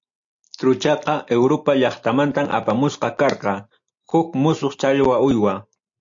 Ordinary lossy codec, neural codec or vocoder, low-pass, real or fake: AAC, 64 kbps; none; 7.2 kHz; real